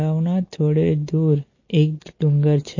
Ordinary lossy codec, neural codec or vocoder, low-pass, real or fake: MP3, 32 kbps; none; 7.2 kHz; real